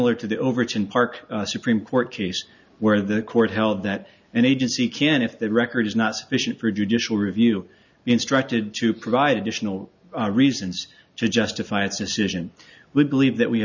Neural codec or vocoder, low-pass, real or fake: none; 7.2 kHz; real